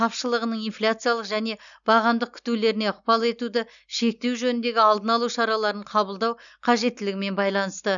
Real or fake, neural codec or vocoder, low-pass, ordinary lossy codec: real; none; 7.2 kHz; none